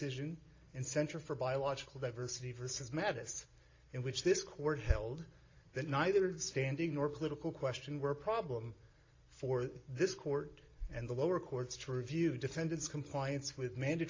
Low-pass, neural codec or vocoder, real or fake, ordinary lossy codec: 7.2 kHz; none; real; AAC, 32 kbps